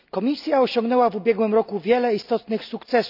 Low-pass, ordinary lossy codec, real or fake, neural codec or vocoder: 5.4 kHz; none; real; none